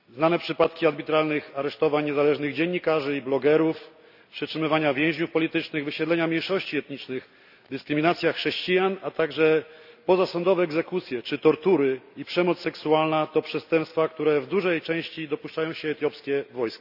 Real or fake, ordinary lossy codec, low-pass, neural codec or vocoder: real; none; 5.4 kHz; none